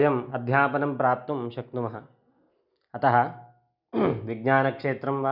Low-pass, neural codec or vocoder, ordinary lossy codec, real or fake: 5.4 kHz; none; none; real